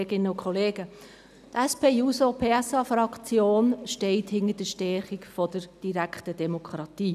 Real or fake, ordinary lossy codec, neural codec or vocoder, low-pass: real; none; none; 14.4 kHz